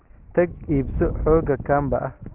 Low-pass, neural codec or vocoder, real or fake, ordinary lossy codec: 3.6 kHz; none; real; Opus, 16 kbps